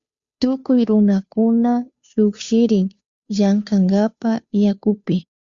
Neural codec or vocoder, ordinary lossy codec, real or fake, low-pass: codec, 16 kHz, 2 kbps, FunCodec, trained on Chinese and English, 25 frames a second; Opus, 64 kbps; fake; 7.2 kHz